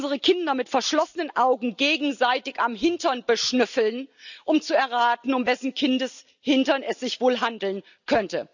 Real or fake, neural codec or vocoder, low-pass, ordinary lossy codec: real; none; 7.2 kHz; none